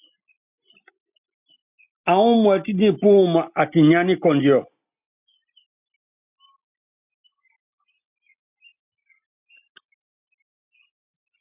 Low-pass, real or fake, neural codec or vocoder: 3.6 kHz; real; none